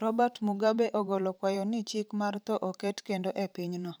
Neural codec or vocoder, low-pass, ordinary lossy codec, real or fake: vocoder, 44.1 kHz, 128 mel bands every 512 samples, BigVGAN v2; none; none; fake